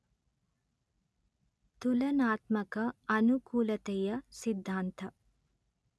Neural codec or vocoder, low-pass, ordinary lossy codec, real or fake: none; none; none; real